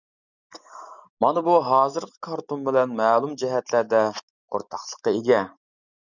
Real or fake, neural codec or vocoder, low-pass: real; none; 7.2 kHz